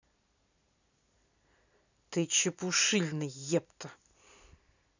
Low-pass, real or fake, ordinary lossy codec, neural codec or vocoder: 7.2 kHz; real; none; none